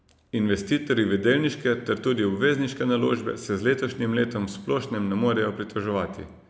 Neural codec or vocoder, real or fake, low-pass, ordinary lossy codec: none; real; none; none